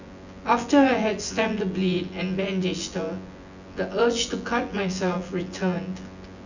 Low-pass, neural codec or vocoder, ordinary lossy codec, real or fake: 7.2 kHz; vocoder, 24 kHz, 100 mel bands, Vocos; none; fake